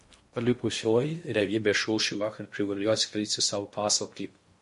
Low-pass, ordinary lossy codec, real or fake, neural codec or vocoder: 10.8 kHz; MP3, 48 kbps; fake; codec, 16 kHz in and 24 kHz out, 0.6 kbps, FocalCodec, streaming, 2048 codes